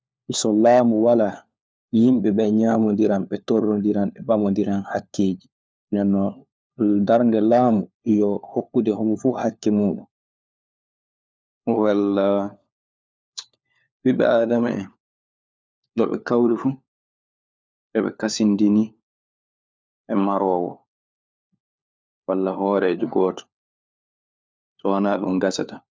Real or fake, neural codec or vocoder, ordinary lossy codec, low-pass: fake; codec, 16 kHz, 4 kbps, FunCodec, trained on LibriTTS, 50 frames a second; none; none